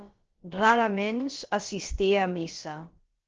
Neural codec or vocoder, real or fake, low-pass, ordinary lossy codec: codec, 16 kHz, about 1 kbps, DyCAST, with the encoder's durations; fake; 7.2 kHz; Opus, 16 kbps